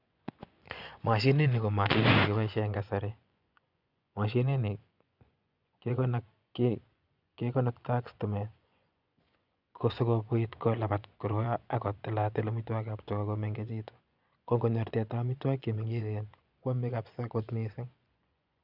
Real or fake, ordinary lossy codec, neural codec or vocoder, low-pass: fake; none; vocoder, 24 kHz, 100 mel bands, Vocos; 5.4 kHz